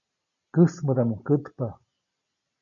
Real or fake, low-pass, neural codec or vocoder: real; 7.2 kHz; none